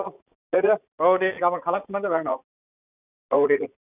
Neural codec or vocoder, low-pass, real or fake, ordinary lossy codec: vocoder, 44.1 kHz, 80 mel bands, Vocos; 3.6 kHz; fake; none